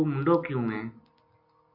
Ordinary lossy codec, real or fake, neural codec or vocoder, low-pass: AAC, 48 kbps; real; none; 5.4 kHz